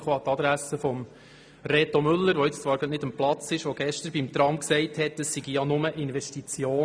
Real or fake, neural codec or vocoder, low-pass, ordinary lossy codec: real; none; none; none